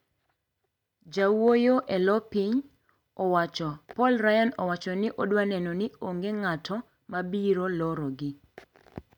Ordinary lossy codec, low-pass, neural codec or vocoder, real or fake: MP3, 96 kbps; 19.8 kHz; none; real